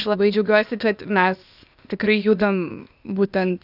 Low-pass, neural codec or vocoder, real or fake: 5.4 kHz; codec, 16 kHz, 0.8 kbps, ZipCodec; fake